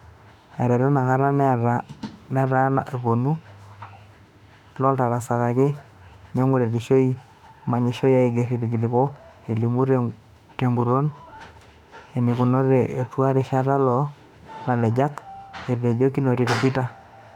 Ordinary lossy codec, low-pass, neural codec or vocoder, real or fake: none; 19.8 kHz; autoencoder, 48 kHz, 32 numbers a frame, DAC-VAE, trained on Japanese speech; fake